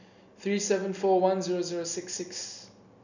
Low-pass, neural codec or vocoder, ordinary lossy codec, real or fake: 7.2 kHz; none; none; real